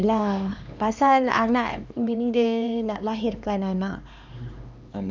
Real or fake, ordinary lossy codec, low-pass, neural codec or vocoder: fake; none; none; codec, 16 kHz, 2 kbps, X-Codec, HuBERT features, trained on LibriSpeech